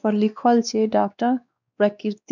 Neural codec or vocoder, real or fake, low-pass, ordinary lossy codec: codec, 16 kHz, 2 kbps, X-Codec, WavLM features, trained on Multilingual LibriSpeech; fake; 7.2 kHz; none